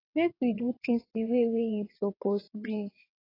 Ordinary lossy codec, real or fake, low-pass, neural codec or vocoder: AAC, 24 kbps; fake; 5.4 kHz; vocoder, 22.05 kHz, 80 mel bands, WaveNeXt